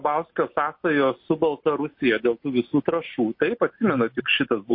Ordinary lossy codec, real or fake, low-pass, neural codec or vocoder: MP3, 32 kbps; real; 5.4 kHz; none